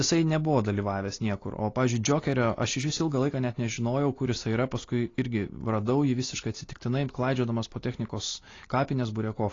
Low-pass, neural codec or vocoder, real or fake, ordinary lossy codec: 7.2 kHz; none; real; AAC, 32 kbps